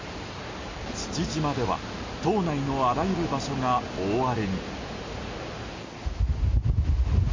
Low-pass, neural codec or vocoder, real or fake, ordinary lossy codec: 7.2 kHz; none; real; MP3, 32 kbps